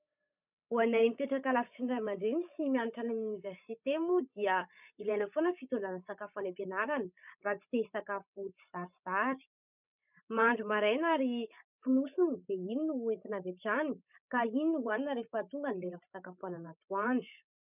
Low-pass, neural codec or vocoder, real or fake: 3.6 kHz; codec, 16 kHz, 16 kbps, FreqCodec, larger model; fake